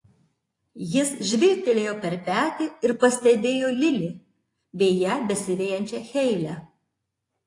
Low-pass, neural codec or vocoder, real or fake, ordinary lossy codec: 10.8 kHz; vocoder, 24 kHz, 100 mel bands, Vocos; fake; AAC, 48 kbps